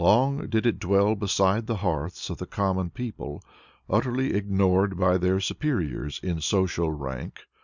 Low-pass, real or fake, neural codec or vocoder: 7.2 kHz; real; none